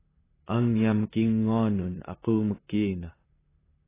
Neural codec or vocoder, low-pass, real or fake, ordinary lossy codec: vocoder, 44.1 kHz, 128 mel bands, Pupu-Vocoder; 3.6 kHz; fake; AAC, 16 kbps